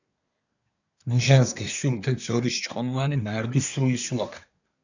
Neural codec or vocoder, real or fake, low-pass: codec, 24 kHz, 1 kbps, SNAC; fake; 7.2 kHz